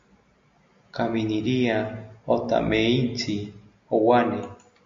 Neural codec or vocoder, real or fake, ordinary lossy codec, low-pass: none; real; MP3, 48 kbps; 7.2 kHz